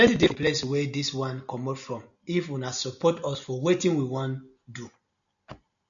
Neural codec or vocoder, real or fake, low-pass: none; real; 7.2 kHz